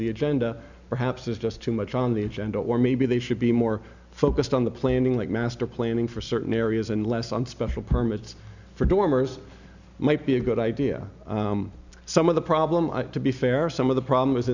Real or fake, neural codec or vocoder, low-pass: real; none; 7.2 kHz